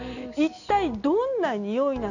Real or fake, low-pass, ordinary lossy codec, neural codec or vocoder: real; 7.2 kHz; none; none